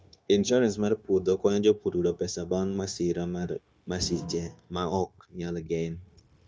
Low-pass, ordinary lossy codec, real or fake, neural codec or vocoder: none; none; fake; codec, 16 kHz, 0.9 kbps, LongCat-Audio-Codec